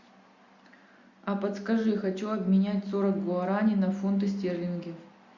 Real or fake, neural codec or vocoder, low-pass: real; none; 7.2 kHz